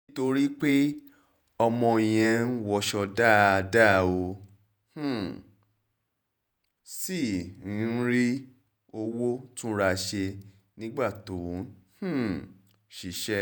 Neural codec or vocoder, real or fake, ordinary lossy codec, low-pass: vocoder, 48 kHz, 128 mel bands, Vocos; fake; none; none